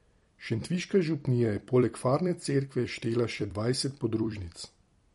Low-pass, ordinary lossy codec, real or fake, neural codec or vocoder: 19.8 kHz; MP3, 48 kbps; fake; vocoder, 44.1 kHz, 128 mel bands every 256 samples, BigVGAN v2